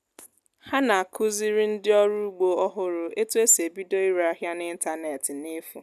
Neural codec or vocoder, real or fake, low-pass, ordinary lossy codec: none; real; 14.4 kHz; none